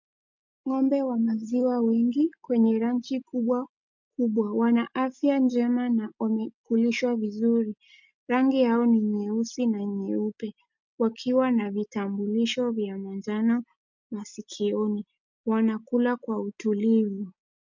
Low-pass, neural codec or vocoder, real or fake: 7.2 kHz; none; real